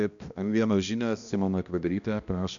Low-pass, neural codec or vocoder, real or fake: 7.2 kHz; codec, 16 kHz, 1 kbps, X-Codec, HuBERT features, trained on balanced general audio; fake